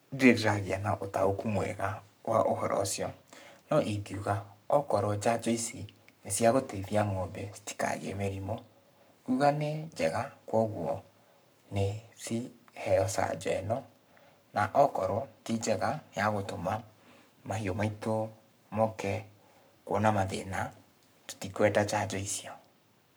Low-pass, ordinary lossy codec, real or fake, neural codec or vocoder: none; none; fake; codec, 44.1 kHz, 7.8 kbps, Pupu-Codec